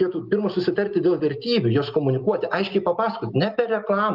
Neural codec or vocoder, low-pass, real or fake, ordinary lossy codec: none; 5.4 kHz; real; Opus, 24 kbps